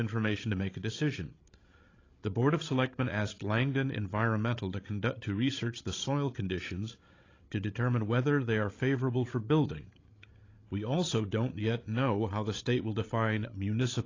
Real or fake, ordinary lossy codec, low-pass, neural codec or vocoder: fake; AAC, 32 kbps; 7.2 kHz; codec, 16 kHz, 16 kbps, FreqCodec, larger model